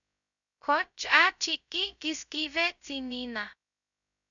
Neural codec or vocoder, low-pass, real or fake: codec, 16 kHz, 0.2 kbps, FocalCodec; 7.2 kHz; fake